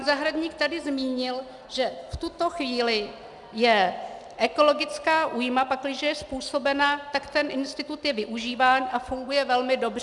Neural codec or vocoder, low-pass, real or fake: none; 10.8 kHz; real